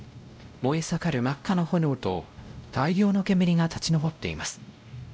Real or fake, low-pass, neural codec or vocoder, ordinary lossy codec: fake; none; codec, 16 kHz, 0.5 kbps, X-Codec, WavLM features, trained on Multilingual LibriSpeech; none